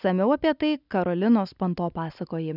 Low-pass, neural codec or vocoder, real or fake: 5.4 kHz; none; real